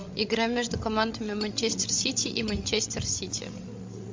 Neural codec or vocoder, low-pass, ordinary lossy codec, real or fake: codec, 16 kHz, 16 kbps, FreqCodec, larger model; 7.2 kHz; MP3, 48 kbps; fake